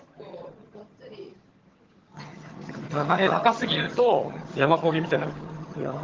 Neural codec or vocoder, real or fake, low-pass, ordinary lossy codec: vocoder, 22.05 kHz, 80 mel bands, HiFi-GAN; fake; 7.2 kHz; Opus, 16 kbps